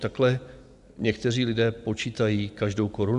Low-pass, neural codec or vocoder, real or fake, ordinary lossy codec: 10.8 kHz; none; real; AAC, 96 kbps